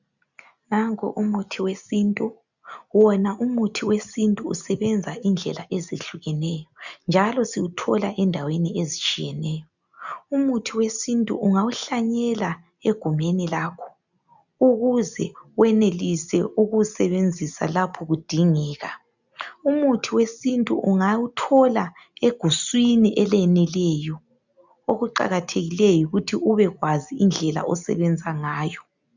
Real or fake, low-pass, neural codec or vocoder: real; 7.2 kHz; none